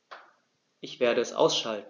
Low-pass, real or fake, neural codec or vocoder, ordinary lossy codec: 7.2 kHz; real; none; none